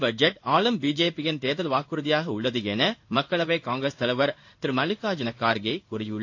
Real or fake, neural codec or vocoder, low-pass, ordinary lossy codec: fake; codec, 16 kHz in and 24 kHz out, 1 kbps, XY-Tokenizer; 7.2 kHz; AAC, 48 kbps